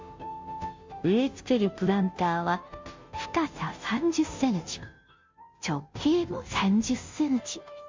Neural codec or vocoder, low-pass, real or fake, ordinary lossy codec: codec, 16 kHz, 0.5 kbps, FunCodec, trained on Chinese and English, 25 frames a second; 7.2 kHz; fake; MP3, 64 kbps